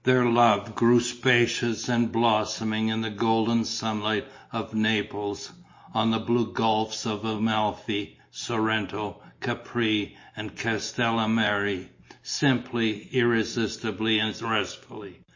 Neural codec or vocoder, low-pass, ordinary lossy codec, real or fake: none; 7.2 kHz; MP3, 32 kbps; real